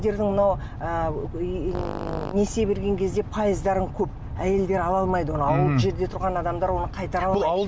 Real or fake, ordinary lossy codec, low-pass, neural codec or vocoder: real; none; none; none